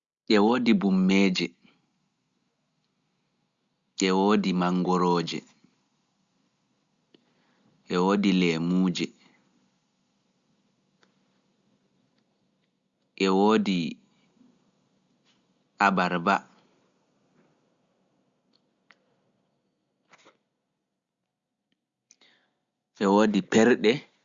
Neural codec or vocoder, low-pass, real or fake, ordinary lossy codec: none; 7.2 kHz; real; Opus, 64 kbps